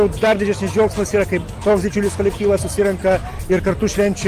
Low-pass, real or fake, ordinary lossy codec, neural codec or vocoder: 14.4 kHz; real; Opus, 32 kbps; none